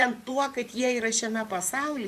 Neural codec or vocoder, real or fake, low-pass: vocoder, 44.1 kHz, 128 mel bands, Pupu-Vocoder; fake; 14.4 kHz